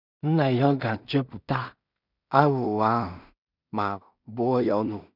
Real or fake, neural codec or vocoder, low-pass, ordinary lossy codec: fake; codec, 16 kHz in and 24 kHz out, 0.4 kbps, LongCat-Audio-Codec, two codebook decoder; 5.4 kHz; none